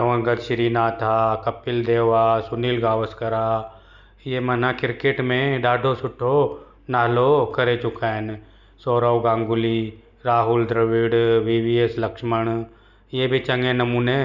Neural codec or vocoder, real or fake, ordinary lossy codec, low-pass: none; real; none; 7.2 kHz